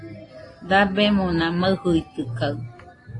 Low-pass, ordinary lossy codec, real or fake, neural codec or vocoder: 10.8 kHz; AAC, 32 kbps; real; none